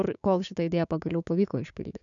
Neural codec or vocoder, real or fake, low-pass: codec, 16 kHz, 6 kbps, DAC; fake; 7.2 kHz